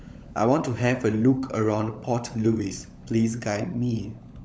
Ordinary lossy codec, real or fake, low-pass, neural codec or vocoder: none; fake; none; codec, 16 kHz, 16 kbps, FunCodec, trained on LibriTTS, 50 frames a second